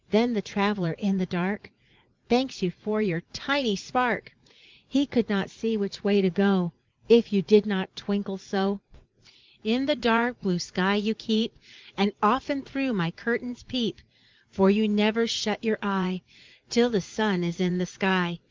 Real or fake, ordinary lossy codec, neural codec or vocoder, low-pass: fake; Opus, 24 kbps; vocoder, 44.1 kHz, 80 mel bands, Vocos; 7.2 kHz